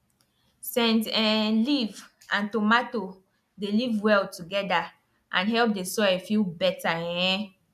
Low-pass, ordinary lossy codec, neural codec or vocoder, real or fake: 14.4 kHz; none; none; real